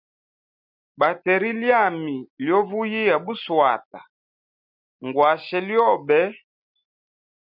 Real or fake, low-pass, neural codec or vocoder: real; 5.4 kHz; none